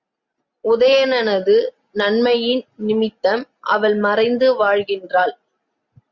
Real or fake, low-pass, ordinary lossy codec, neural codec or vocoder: real; 7.2 kHz; Opus, 64 kbps; none